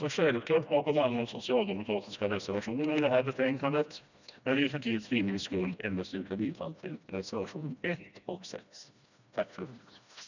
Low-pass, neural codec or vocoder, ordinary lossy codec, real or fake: 7.2 kHz; codec, 16 kHz, 1 kbps, FreqCodec, smaller model; none; fake